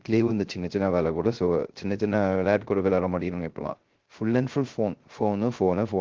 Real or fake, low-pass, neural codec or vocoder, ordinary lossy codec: fake; 7.2 kHz; codec, 16 kHz, 0.3 kbps, FocalCodec; Opus, 16 kbps